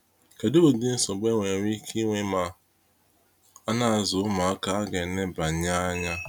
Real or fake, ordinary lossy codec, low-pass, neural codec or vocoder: real; none; none; none